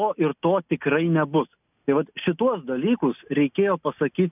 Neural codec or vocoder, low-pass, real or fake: none; 3.6 kHz; real